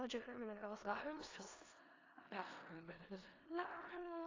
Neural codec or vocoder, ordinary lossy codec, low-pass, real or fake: codec, 16 kHz in and 24 kHz out, 0.4 kbps, LongCat-Audio-Codec, four codebook decoder; AAC, 32 kbps; 7.2 kHz; fake